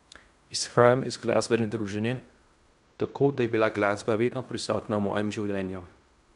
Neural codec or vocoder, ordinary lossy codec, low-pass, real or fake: codec, 16 kHz in and 24 kHz out, 0.9 kbps, LongCat-Audio-Codec, fine tuned four codebook decoder; none; 10.8 kHz; fake